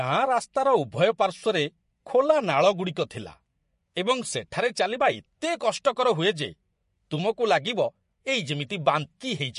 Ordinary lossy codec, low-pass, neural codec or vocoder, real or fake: MP3, 48 kbps; 10.8 kHz; vocoder, 24 kHz, 100 mel bands, Vocos; fake